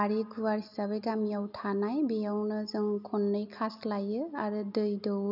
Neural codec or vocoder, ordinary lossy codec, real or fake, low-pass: none; none; real; 5.4 kHz